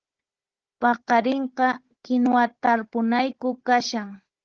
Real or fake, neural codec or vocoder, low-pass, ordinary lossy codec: fake; codec, 16 kHz, 16 kbps, FunCodec, trained on Chinese and English, 50 frames a second; 7.2 kHz; Opus, 16 kbps